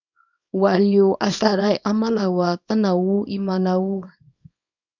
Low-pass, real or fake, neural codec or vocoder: 7.2 kHz; fake; codec, 24 kHz, 0.9 kbps, WavTokenizer, small release